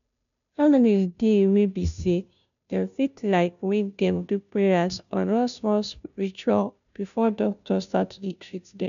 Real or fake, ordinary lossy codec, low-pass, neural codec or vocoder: fake; none; 7.2 kHz; codec, 16 kHz, 0.5 kbps, FunCodec, trained on Chinese and English, 25 frames a second